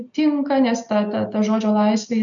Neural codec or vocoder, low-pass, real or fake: none; 7.2 kHz; real